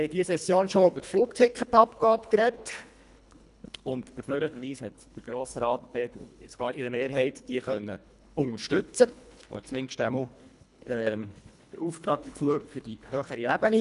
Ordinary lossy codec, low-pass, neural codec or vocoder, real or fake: none; 10.8 kHz; codec, 24 kHz, 1.5 kbps, HILCodec; fake